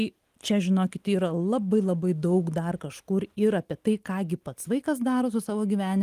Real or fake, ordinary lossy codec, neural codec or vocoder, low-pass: real; Opus, 24 kbps; none; 14.4 kHz